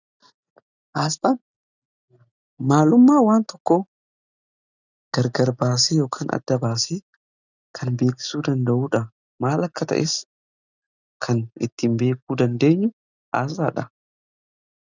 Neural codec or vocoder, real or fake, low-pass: none; real; 7.2 kHz